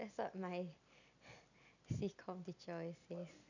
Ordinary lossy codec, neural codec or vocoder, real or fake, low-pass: none; none; real; 7.2 kHz